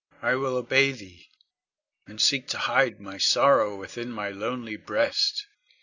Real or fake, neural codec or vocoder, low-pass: real; none; 7.2 kHz